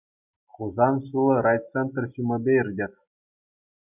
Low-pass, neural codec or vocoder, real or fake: 3.6 kHz; none; real